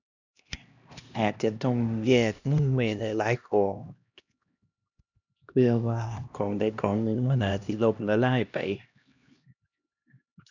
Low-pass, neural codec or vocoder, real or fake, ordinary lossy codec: 7.2 kHz; codec, 16 kHz, 1 kbps, X-Codec, HuBERT features, trained on LibriSpeech; fake; none